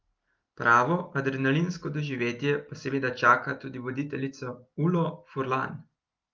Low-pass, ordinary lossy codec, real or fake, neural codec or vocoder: 7.2 kHz; Opus, 32 kbps; real; none